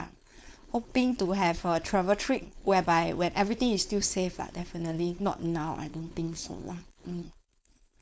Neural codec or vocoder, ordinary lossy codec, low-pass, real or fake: codec, 16 kHz, 4.8 kbps, FACodec; none; none; fake